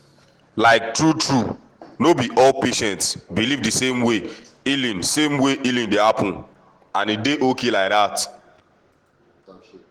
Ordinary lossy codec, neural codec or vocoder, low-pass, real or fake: Opus, 16 kbps; none; 19.8 kHz; real